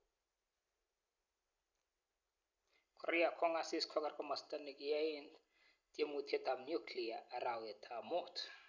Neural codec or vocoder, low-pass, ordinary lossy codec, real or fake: none; 7.2 kHz; none; real